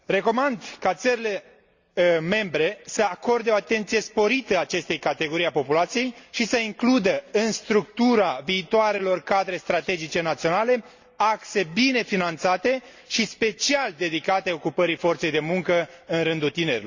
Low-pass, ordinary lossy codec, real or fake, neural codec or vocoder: 7.2 kHz; Opus, 64 kbps; real; none